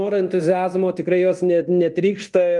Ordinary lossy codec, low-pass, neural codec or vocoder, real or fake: Opus, 32 kbps; 10.8 kHz; codec, 24 kHz, 0.9 kbps, DualCodec; fake